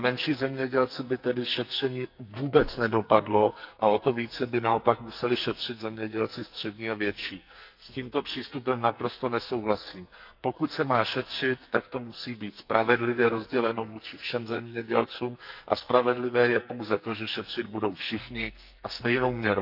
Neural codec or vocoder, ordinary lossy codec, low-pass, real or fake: codec, 44.1 kHz, 2.6 kbps, SNAC; none; 5.4 kHz; fake